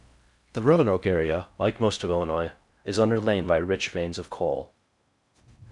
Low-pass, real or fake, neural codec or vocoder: 10.8 kHz; fake; codec, 16 kHz in and 24 kHz out, 0.6 kbps, FocalCodec, streaming, 4096 codes